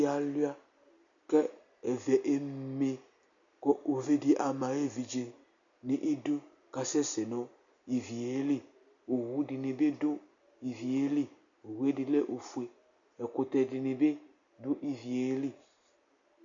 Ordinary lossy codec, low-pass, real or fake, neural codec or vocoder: MP3, 96 kbps; 7.2 kHz; real; none